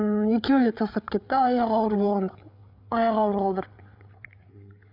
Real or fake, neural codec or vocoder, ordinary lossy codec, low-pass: fake; codec, 16 kHz, 8 kbps, FreqCodec, larger model; none; 5.4 kHz